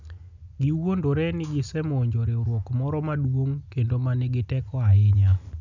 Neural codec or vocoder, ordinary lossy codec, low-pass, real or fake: none; none; 7.2 kHz; real